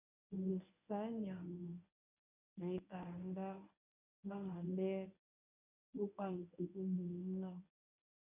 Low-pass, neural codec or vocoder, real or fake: 3.6 kHz; codec, 24 kHz, 0.9 kbps, WavTokenizer, medium speech release version 1; fake